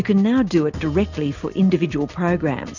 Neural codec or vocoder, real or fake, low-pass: none; real; 7.2 kHz